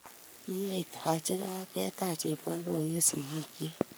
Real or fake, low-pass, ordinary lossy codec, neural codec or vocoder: fake; none; none; codec, 44.1 kHz, 3.4 kbps, Pupu-Codec